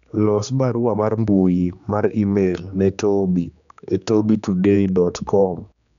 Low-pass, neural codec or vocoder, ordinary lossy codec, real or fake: 7.2 kHz; codec, 16 kHz, 2 kbps, X-Codec, HuBERT features, trained on general audio; none; fake